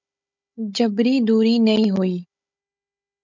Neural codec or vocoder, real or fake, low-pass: codec, 16 kHz, 16 kbps, FunCodec, trained on Chinese and English, 50 frames a second; fake; 7.2 kHz